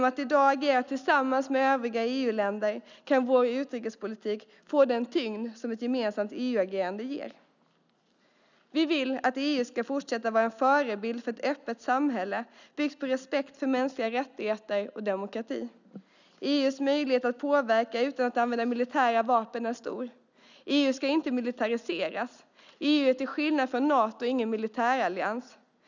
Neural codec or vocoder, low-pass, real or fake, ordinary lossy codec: none; 7.2 kHz; real; none